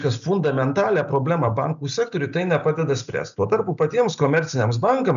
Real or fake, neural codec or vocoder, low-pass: real; none; 7.2 kHz